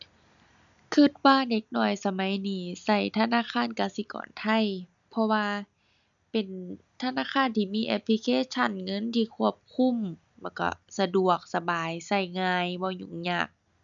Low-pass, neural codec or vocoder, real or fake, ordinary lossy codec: 7.2 kHz; none; real; none